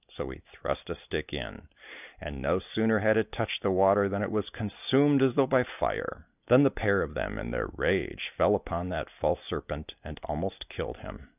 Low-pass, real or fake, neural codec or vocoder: 3.6 kHz; real; none